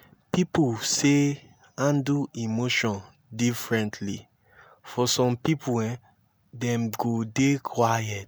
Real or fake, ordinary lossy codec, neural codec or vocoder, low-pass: real; none; none; none